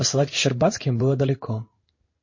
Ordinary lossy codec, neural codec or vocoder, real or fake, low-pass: MP3, 32 kbps; codec, 16 kHz in and 24 kHz out, 1 kbps, XY-Tokenizer; fake; 7.2 kHz